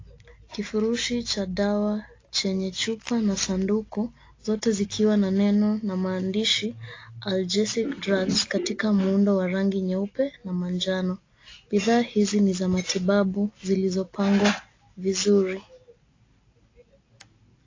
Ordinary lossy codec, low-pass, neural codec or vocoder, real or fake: AAC, 32 kbps; 7.2 kHz; none; real